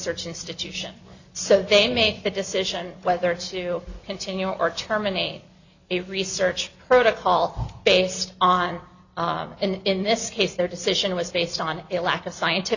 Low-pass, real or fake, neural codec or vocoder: 7.2 kHz; real; none